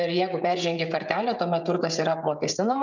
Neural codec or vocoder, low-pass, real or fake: codec, 16 kHz, 16 kbps, FreqCodec, smaller model; 7.2 kHz; fake